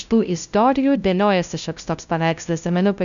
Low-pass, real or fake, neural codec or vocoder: 7.2 kHz; fake; codec, 16 kHz, 0.5 kbps, FunCodec, trained on LibriTTS, 25 frames a second